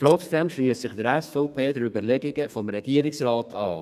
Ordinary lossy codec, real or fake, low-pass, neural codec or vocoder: none; fake; 14.4 kHz; codec, 32 kHz, 1.9 kbps, SNAC